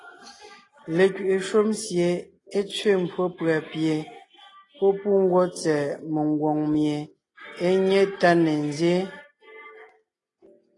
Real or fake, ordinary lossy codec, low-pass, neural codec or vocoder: real; AAC, 32 kbps; 10.8 kHz; none